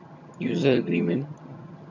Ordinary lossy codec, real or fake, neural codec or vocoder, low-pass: none; fake; vocoder, 22.05 kHz, 80 mel bands, HiFi-GAN; 7.2 kHz